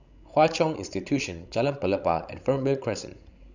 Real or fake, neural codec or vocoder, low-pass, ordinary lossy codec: fake; codec, 16 kHz, 16 kbps, FreqCodec, larger model; 7.2 kHz; none